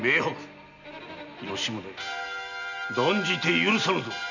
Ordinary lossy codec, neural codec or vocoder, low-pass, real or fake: none; none; 7.2 kHz; real